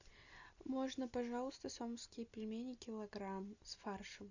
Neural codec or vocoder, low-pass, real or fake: none; 7.2 kHz; real